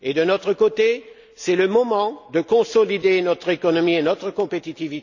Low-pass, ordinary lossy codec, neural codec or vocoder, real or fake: 7.2 kHz; none; none; real